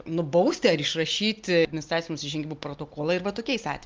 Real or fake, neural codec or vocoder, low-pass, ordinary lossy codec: real; none; 7.2 kHz; Opus, 24 kbps